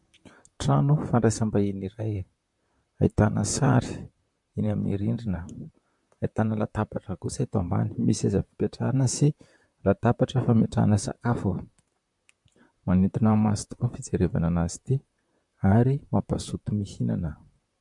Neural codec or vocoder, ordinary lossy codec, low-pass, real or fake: vocoder, 44.1 kHz, 128 mel bands, Pupu-Vocoder; MP3, 64 kbps; 10.8 kHz; fake